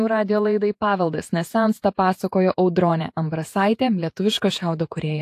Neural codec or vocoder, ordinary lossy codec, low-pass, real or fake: vocoder, 48 kHz, 128 mel bands, Vocos; AAC, 64 kbps; 14.4 kHz; fake